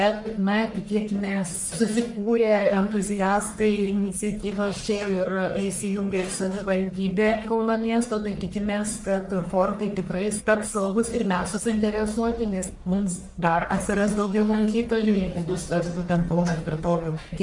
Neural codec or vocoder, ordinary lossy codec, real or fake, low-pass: codec, 44.1 kHz, 1.7 kbps, Pupu-Codec; MP3, 64 kbps; fake; 10.8 kHz